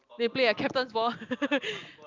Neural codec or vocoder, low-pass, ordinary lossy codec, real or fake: none; 7.2 kHz; Opus, 24 kbps; real